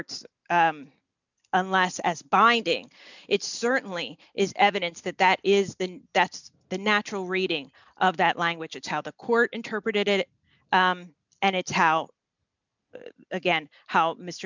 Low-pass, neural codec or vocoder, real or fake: 7.2 kHz; none; real